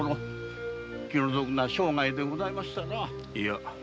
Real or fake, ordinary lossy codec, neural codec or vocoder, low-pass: real; none; none; none